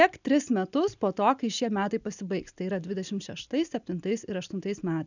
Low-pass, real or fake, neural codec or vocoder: 7.2 kHz; real; none